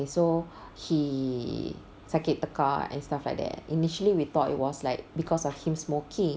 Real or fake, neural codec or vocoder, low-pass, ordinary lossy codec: real; none; none; none